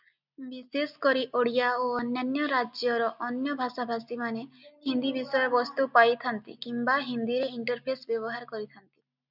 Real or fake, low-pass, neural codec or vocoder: real; 5.4 kHz; none